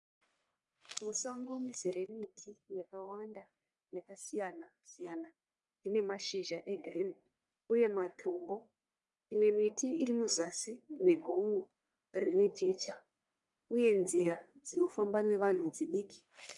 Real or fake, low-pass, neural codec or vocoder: fake; 10.8 kHz; codec, 44.1 kHz, 1.7 kbps, Pupu-Codec